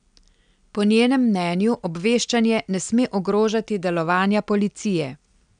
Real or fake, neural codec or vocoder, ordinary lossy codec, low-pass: real; none; none; 9.9 kHz